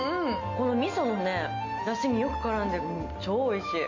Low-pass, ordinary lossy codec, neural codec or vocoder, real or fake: 7.2 kHz; AAC, 48 kbps; none; real